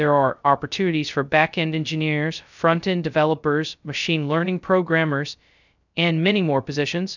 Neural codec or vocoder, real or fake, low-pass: codec, 16 kHz, 0.2 kbps, FocalCodec; fake; 7.2 kHz